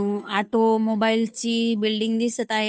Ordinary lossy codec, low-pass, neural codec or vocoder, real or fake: none; none; codec, 16 kHz, 2 kbps, FunCodec, trained on Chinese and English, 25 frames a second; fake